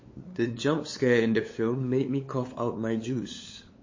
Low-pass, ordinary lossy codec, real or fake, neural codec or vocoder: 7.2 kHz; MP3, 32 kbps; fake; codec, 16 kHz, 8 kbps, FunCodec, trained on LibriTTS, 25 frames a second